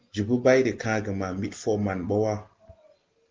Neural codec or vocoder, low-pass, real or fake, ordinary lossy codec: none; 7.2 kHz; real; Opus, 16 kbps